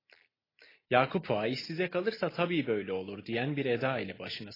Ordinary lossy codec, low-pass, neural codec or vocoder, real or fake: AAC, 24 kbps; 5.4 kHz; none; real